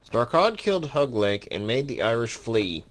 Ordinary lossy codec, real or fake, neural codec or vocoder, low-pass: Opus, 16 kbps; real; none; 10.8 kHz